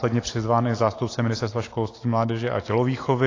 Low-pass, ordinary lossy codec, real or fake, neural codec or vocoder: 7.2 kHz; AAC, 32 kbps; real; none